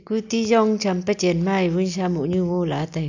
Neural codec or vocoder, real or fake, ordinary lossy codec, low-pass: none; real; none; 7.2 kHz